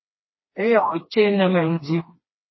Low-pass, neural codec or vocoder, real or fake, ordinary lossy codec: 7.2 kHz; codec, 16 kHz, 2 kbps, FreqCodec, smaller model; fake; MP3, 24 kbps